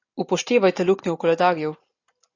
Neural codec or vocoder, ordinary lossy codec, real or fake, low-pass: none; AAC, 48 kbps; real; 7.2 kHz